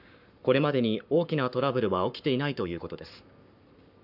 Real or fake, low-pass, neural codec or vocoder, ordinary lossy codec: fake; 5.4 kHz; codec, 44.1 kHz, 7.8 kbps, Pupu-Codec; none